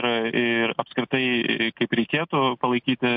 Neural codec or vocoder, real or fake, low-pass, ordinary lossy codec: none; real; 5.4 kHz; MP3, 48 kbps